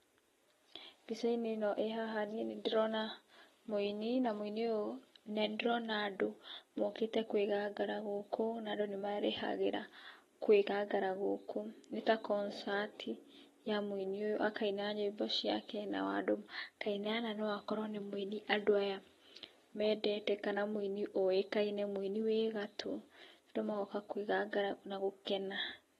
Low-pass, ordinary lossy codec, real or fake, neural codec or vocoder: 19.8 kHz; AAC, 32 kbps; real; none